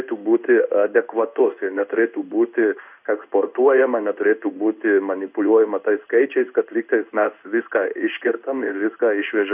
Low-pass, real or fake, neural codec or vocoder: 3.6 kHz; fake; codec, 16 kHz in and 24 kHz out, 1 kbps, XY-Tokenizer